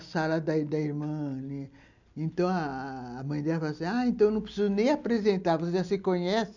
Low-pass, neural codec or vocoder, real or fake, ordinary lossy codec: 7.2 kHz; none; real; none